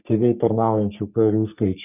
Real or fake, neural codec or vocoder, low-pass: fake; codec, 44.1 kHz, 3.4 kbps, Pupu-Codec; 3.6 kHz